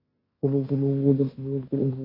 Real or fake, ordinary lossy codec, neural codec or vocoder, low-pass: fake; MP3, 24 kbps; codec, 16 kHz in and 24 kHz out, 0.9 kbps, LongCat-Audio-Codec, fine tuned four codebook decoder; 5.4 kHz